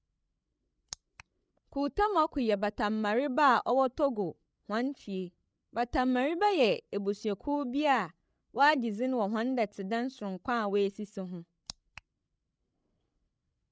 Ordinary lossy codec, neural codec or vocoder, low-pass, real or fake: none; codec, 16 kHz, 16 kbps, FreqCodec, larger model; none; fake